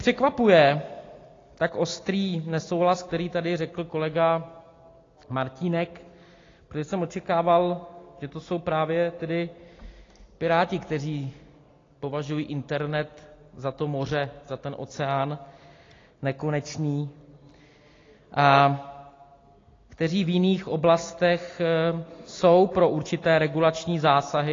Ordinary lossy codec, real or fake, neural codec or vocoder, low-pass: AAC, 32 kbps; real; none; 7.2 kHz